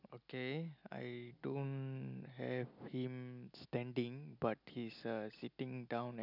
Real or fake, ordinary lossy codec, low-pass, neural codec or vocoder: real; none; 5.4 kHz; none